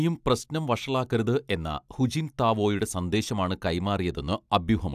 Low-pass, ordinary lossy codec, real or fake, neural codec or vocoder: 14.4 kHz; AAC, 96 kbps; real; none